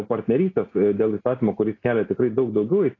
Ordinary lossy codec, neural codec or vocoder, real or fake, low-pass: AAC, 32 kbps; none; real; 7.2 kHz